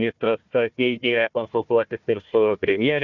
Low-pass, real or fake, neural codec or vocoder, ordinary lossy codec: 7.2 kHz; fake; codec, 16 kHz, 1 kbps, FunCodec, trained on Chinese and English, 50 frames a second; Opus, 64 kbps